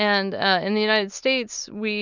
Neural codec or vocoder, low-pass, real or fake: none; 7.2 kHz; real